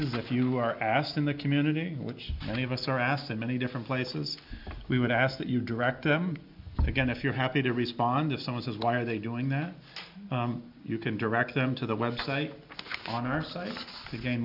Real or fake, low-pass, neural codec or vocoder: real; 5.4 kHz; none